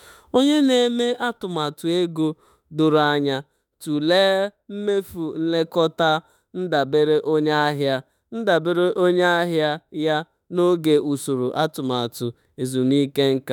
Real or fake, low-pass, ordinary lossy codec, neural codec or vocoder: fake; 19.8 kHz; none; autoencoder, 48 kHz, 32 numbers a frame, DAC-VAE, trained on Japanese speech